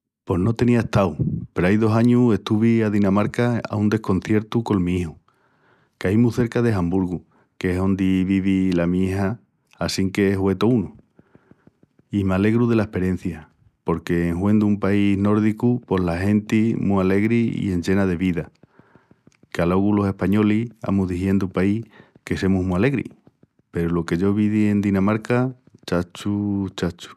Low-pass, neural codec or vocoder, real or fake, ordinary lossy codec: 14.4 kHz; none; real; none